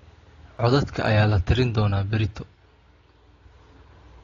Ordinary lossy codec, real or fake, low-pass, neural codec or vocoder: AAC, 32 kbps; real; 7.2 kHz; none